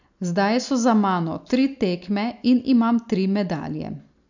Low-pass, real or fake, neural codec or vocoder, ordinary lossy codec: 7.2 kHz; real; none; none